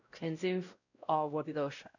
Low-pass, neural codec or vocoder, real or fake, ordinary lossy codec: 7.2 kHz; codec, 16 kHz, 0.5 kbps, X-Codec, WavLM features, trained on Multilingual LibriSpeech; fake; none